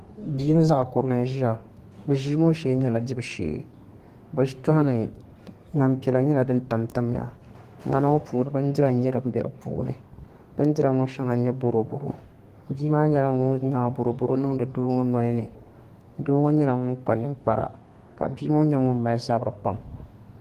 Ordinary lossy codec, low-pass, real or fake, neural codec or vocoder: Opus, 24 kbps; 14.4 kHz; fake; codec, 32 kHz, 1.9 kbps, SNAC